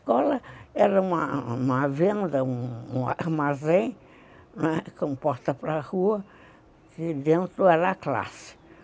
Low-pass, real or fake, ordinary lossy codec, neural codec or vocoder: none; real; none; none